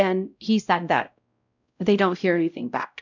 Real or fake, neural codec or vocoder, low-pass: fake; codec, 16 kHz, 0.5 kbps, X-Codec, WavLM features, trained on Multilingual LibriSpeech; 7.2 kHz